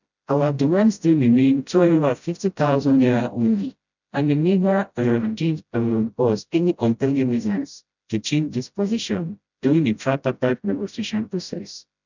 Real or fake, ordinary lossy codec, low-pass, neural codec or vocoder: fake; none; 7.2 kHz; codec, 16 kHz, 0.5 kbps, FreqCodec, smaller model